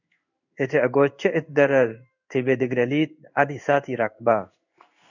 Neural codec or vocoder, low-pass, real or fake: codec, 16 kHz in and 24 kHz out, 1 kbps, XY-Tokenizer; 7.2 kHz; fake